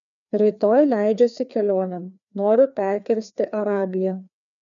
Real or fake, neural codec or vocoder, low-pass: fake; codec, 16 kHz, 2 kbps, FreqCodec, larger model; 7.2 kHz